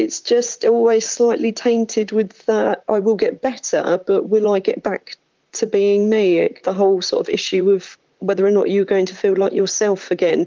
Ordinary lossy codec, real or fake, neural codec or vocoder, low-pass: Opus, 32 kbps; fake; vocoder, 44.1 kHz, 128 mel bands, Pupu-Vocoder; 7.2 kHz